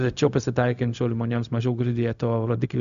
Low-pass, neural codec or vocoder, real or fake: 7.2 kHz; codec, 16 kHz, 0.4 kbps, LongCat-Audio-Codec; fake